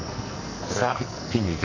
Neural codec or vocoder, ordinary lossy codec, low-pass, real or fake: codec, 44.1 kHz, 2.6 kbps, SNAC; none; 7.2 kHz; fake